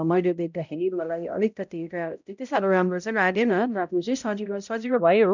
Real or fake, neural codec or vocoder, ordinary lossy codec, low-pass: fake; codec, 16 kHz, 0.5 kbps, X-Codec, HuBERT features, trained on balanced general audio; none; 7.2 kHz